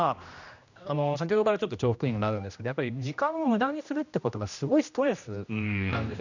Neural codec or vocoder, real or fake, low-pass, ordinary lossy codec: codec, 16 kHz, 1 kbps, X-Codec, HuBERT features, trained on general audio; fake; 7.2 kHz; none